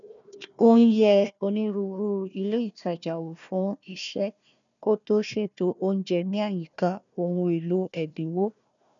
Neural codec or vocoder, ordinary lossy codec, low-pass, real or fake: codec, 16 kHz, 1 kbps, FunCodec, trained on Chinese and English, 50 frames a second; none; 7.2 kHz; fake